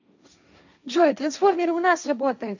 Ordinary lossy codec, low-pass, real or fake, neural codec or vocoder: none; 7.2 kHz; fake; codec, 16 kHz, 1.1 kbps, Voila-Tokenizer